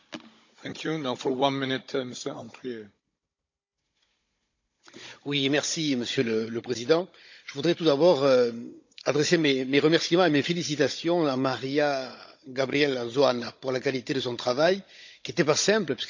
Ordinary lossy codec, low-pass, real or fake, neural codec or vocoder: MP3, 64 kbps; 7.2 kHz; fake; codec, 16 kHz, 16 kbps, FunCodec, trained on Chinese and English, 50 frames a second